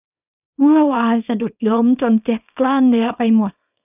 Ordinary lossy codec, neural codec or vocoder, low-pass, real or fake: none; codec, 24 kHz, 0.9 kbps, WavTokenizer, small release; 3.6 kHz; fake